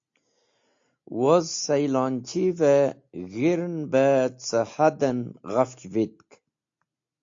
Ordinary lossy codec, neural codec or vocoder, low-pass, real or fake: AAC, 48 kbps; none; 7.2 kHz; real